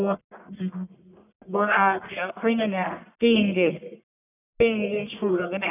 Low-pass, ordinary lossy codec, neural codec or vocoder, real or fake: 3.6 kHz; none; codec, 44.1 kHz, 1.7 kbps, Pupu-Codec; fake